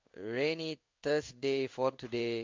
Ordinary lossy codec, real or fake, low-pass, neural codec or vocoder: MP3, 48 kbps; fake; 7.2 kHz; codec, 16 kHz in and 24 kHz out, 1 kbps, XY-Tokenizer